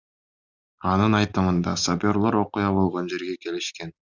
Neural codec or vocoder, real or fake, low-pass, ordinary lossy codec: none; real; 7.2 kHz; Opus, 64 kbps